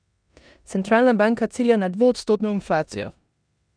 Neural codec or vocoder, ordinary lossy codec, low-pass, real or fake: codec, 16 kHz in and 24 kHz out, 0.9 kbps, LongCat-Audio-Codec, four codebook decoder; none; 9.9 kHz; fake